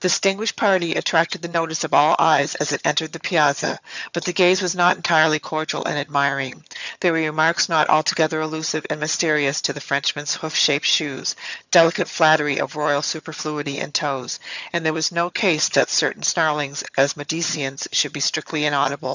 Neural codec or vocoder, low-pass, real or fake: vocoder, 22.05 kHz, 80 mel bands, HiFi-GAN; 7.2 kHz; fake